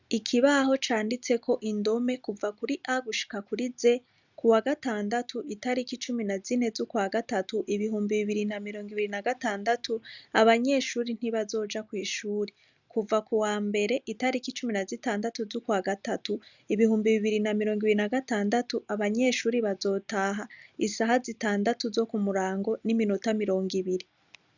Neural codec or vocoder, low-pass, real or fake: none; 7.2 kHz; real